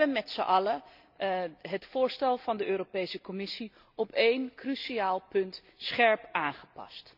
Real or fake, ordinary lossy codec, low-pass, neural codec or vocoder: real; none; 5.4 kHz; none